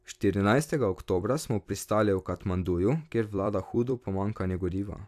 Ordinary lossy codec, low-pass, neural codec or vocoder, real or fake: AAC, 96 kbps; 14.4 kHz; vocoder, 44.1 kHz, 128 mel bands every 256 samples, BigVGAN v2; fake